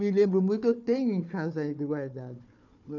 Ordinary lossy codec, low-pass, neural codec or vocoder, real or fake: none; 7.2 kHz; codec, 16 kHz, 4 kbps, FunCodec, trained on Chinese and English, 50 frames a second; fake